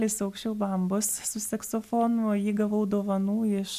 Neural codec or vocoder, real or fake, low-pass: none; real; 14.4 kHz